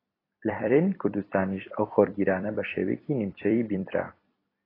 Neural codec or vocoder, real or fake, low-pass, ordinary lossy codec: vocoder, 44.1 kHz, 128 mel bands every 256 samples, BigVGAN v2; fake; 5.4 kHz; AAC, 24 kbps